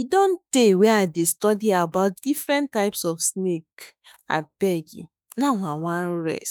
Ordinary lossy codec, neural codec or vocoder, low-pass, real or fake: none; autoencoder, 48 kHz, 32 numbers a frame, DAC-VAE, trained on Japanese speech; none; fake